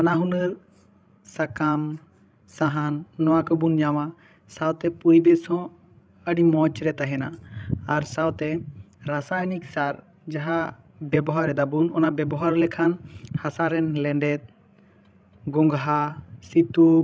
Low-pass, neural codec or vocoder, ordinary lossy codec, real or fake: none; codec, 16 kHz, 16 kbps, FreqCodec, larger model; none; fake